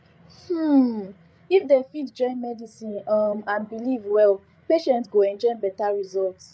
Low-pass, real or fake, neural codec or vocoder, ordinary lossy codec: none; fake; codec, 16 kHz, 8 kbps, FreqCodec, larger model; none